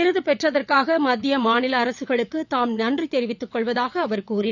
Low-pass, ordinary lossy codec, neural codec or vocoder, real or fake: 7.2 kHz; none; vocoder, 22.05 kHz, 80 mel bands, WaveNeXt; fake